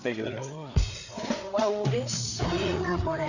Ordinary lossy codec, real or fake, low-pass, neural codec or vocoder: none; fake; 7.2 kHz; codec, 16 kHz, 4 kbps, FreqCodec, larger model